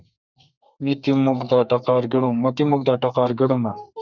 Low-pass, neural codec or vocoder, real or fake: 7.2 kHz; codec, 32 kHz, 1.9 kbps, SNAC; fake